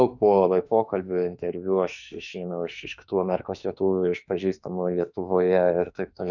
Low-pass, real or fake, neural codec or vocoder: 7.2 kHz; fake; autoencoder, 48 kHz, 32 numbers a frame, DAC-VAE, trained on Japanese speech